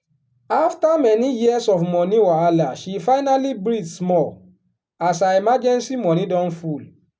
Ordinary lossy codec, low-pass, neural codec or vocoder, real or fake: none; none; none; real